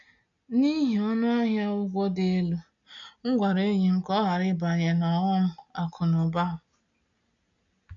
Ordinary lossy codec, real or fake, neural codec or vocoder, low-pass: none; real; none; 7.2 kHz